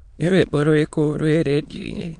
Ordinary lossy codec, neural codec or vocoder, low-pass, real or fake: MP3, 64 kbps; autoencoder, 22.05 kHz, a latent of 192 numbers a frame, VITS, trained on many speakers; 9.9 kHz; fake